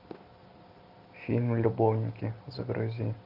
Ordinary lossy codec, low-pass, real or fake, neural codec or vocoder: AAC, 48 kbps; 5.4 kHz; real; none